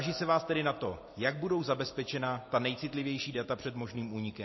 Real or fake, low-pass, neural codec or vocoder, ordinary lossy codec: real; 7.2 kHz; none; MP3, 24 kbps